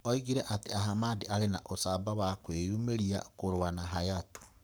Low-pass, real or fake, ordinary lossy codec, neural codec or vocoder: none; fake; none; codec, 44.1 kHz, 7.8 kbps, Pupu-Codec